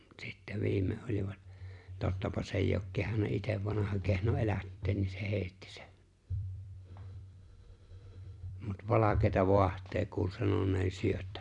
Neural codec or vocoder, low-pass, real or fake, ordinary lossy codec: vocoder, 44.1 kHz, 128 mel bands every 256 samples, BigVGAN v2; 10.8 kHz; fake; none